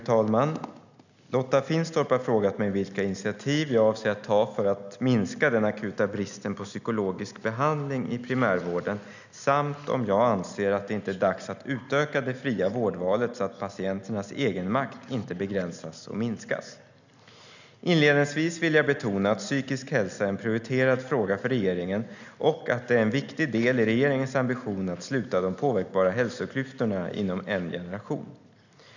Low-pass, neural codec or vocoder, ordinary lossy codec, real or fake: 7.2 kHz; none; none; real